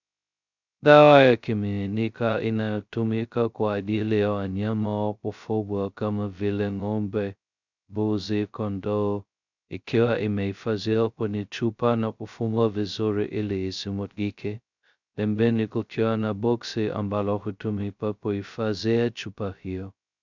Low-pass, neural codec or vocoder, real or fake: 7.2 kHz; codec, 16 kHz, 0.2 kbps, FocalCodec; fake